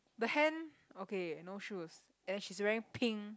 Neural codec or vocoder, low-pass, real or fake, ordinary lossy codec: none; none; real; none